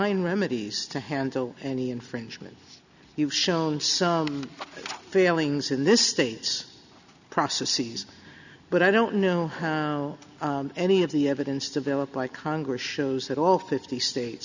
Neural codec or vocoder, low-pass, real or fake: none; 7.2 kHz; real